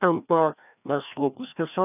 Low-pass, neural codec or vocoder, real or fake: 3.6 kHz; codec, 16 kHz, 1 kbps, FreqCodec, larger model; fake